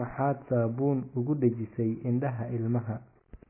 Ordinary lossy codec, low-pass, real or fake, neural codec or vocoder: MP3, 16 kbps; 3.6 kHz; real; none